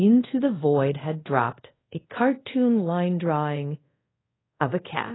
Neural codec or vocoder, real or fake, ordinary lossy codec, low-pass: codec, 24 kHz, 0.5 kbps, DualCodec; fake; AAC, 16 kbps; 7.2 kHz